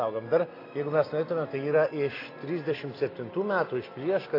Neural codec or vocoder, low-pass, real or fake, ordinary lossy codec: none; 5.4 kHz; real; AAC, 24 kbps